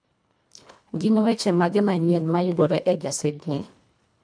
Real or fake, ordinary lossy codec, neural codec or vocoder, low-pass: fake; MP3, 96 kbps; codec, 24 kHz, 1.5 kbps, HILCodec; 9.9 kHz